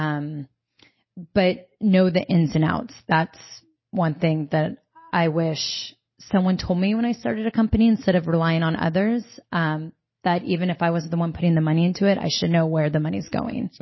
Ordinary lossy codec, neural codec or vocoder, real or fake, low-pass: MP3, 24 kbps; none; real; 7.2 kHz